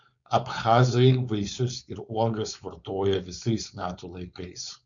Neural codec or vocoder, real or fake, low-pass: codec, 16 kHz, 4.8 kbps, FACodec; fake; 7.2 kHz